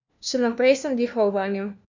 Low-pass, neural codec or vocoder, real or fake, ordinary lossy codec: 7.2 kHz; codec, 16 kHz, 1 kbps, FunCodec, trained on LibriTTS, 50 frames a second; fake; none